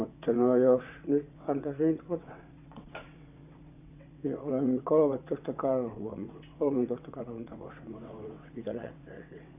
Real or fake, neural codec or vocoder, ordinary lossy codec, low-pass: fake; vocoder, 22.05 kHz, 80 mel bands, WaveNeXt; none; 3.6 kHz